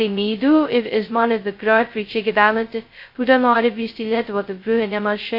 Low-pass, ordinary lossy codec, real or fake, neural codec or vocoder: 5.4 kHz; MP3, 32 kbps; fake; codec, 16 kHz, 0.2 kbps, FocalCodec